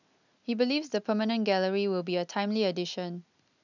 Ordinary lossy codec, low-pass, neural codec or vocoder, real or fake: none; 7.2 kHz; none; real